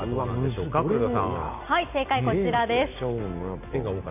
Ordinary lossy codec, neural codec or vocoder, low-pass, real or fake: none; none; 3.6 kHz; real